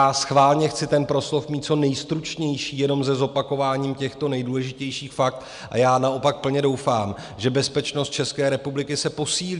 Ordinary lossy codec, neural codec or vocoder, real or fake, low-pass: MP3, 96 kbps; none; real; 10.8 kHz